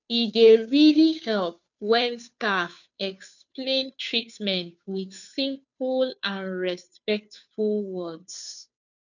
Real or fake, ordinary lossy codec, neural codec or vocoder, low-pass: fake; none; codec, 16 kHz, 2 kbps, FunCodec, trained on Chinese and English, 25 frames a second; 7.2 kHz